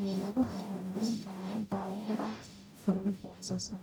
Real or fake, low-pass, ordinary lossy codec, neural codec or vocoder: fake; none; none; codec, 44.1 kHz, 0.9 kbps, DAC